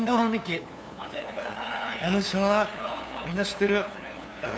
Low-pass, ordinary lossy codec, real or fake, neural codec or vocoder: none; none; fake; codec, 16 kHz, 2 kbps, FunCodec, trained on LibriTTS, 25 frames a second